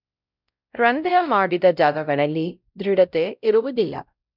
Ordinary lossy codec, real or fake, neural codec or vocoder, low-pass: none; fake; codec, 16 kHz, 0.5 kbps, X-Codec, WavLM features, trained on Multilingual LibriSpeech; 5.4 kHz